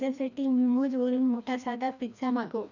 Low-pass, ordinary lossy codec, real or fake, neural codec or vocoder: 7.2 kHz; none; fake; codec, 16 kHz, 1 kbps, FreqCodec, larger model